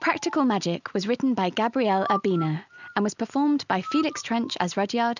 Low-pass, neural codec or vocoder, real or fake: 7.2 kHz; none; real